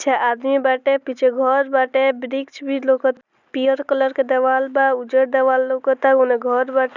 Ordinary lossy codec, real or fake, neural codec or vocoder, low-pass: none; real; none; 7.2 kHz